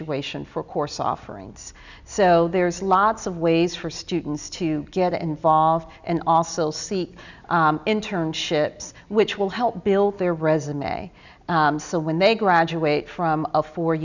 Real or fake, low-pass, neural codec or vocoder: real; 7.2 kHz; none